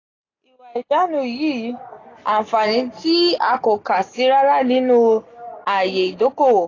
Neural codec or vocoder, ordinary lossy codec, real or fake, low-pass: none; AAC, 32 kbps; real; 7.2 kHz